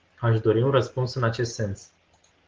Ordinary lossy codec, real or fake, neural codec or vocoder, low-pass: Opus, 16 kbps; real; none; 7.2 kHz